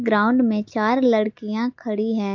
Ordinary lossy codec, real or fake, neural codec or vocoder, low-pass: MP3, 48 kbps; real; none; 7.2 kHz